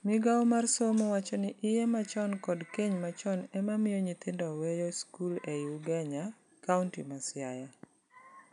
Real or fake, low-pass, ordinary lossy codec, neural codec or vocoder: real; 10.8 kHz; none; none